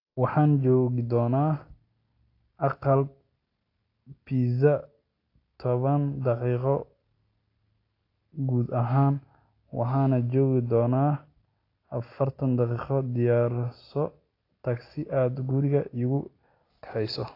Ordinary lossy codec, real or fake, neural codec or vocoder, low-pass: AAC, 32 kbps; real; none; 5.4 kHz